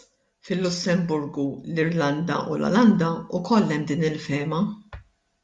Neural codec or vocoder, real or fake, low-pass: none; real; 10.8 kHz